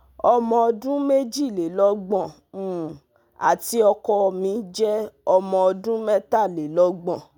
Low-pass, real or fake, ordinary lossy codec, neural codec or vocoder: 19.8 kHz; real; none; none